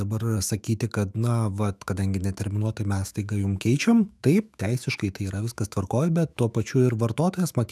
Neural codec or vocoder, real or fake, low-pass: autoencoder, 48 kHz, 128 numbers a frame, DAC-VAE, trained on Japanese speech; fake; 14.4 kHz